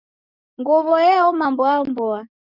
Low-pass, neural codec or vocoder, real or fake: 5.4 kHz; codec, 44.1 kHz, 7.8 kbps, DAC; fake